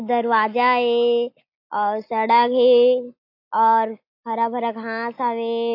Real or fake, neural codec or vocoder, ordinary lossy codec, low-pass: real; none; AAC, 32 kbps; 5.4 kHz